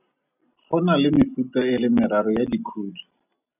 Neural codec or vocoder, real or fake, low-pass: none; real; 3.6 kHz